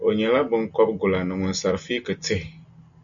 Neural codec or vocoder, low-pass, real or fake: none; 7.2 kHz; real